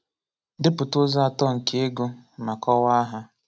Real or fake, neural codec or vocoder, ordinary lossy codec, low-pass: real; none; none; none